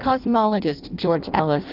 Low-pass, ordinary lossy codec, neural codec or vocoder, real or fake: 5.4 kHz; Opus, 32 kbps; codec, 16 kHz in and 24 kHz out, 0.6 kbps, FireRedTTS-2 codec; fake